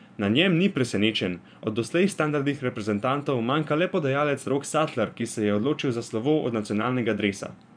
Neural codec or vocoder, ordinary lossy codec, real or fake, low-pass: vocoder, 24 kHz, 100 mel bands, Vocos; none; fake; 9.9 kHz